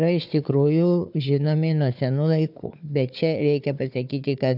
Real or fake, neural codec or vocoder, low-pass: fake; codec, 16 kHz, 4 kbps, FunCodec, trained on Chinese and English, 50 frames a second; 5.4 kHz